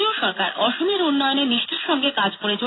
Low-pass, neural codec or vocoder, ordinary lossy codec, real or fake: 7.2 kHz; none; AAC, 16 kbps; real